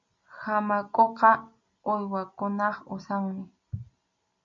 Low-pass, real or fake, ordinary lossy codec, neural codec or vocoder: 7.2 kHz; real; MP3, 64 kbps; none